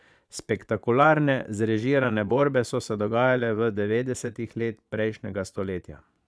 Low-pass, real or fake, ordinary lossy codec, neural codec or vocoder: none; fake; none; vocoder, 22.05 kHz, 80 mel bands, Vocos